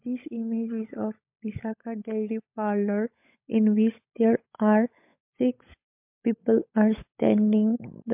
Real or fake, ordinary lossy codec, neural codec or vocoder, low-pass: fake; MP3, 32 kbps; codec, 16 kHz, 16 kbps, FunCodec, trained on LibriTTS, 50 frames a second; 3.6 kHz